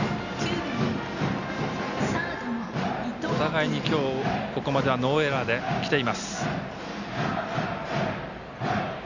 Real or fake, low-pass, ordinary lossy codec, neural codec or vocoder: real; 7.2 kHz; none; none